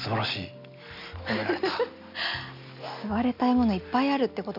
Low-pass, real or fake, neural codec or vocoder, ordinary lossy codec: 5.4 kHz; real; none; none